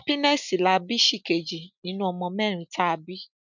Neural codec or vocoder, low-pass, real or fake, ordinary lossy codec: none; 7.2 kHz; real; none